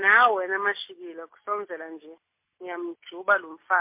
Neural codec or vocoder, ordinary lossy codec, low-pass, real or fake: none; MP3, 24 kbps; 3.6 kHz; real